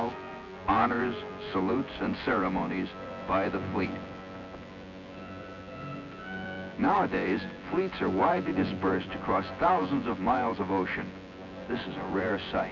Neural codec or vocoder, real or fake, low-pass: vocoder, 24 kHz, 100 mel bands, Vocos; fake; 7.2 kHz